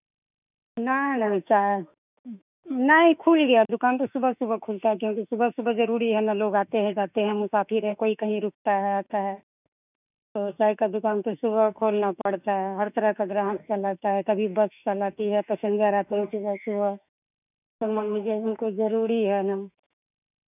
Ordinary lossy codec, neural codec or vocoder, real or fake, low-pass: none; autoencoder, 48 kHz, 32 numbers a frame, DAC-VAE, trained on Japanese speech; fake; 3.6 kHz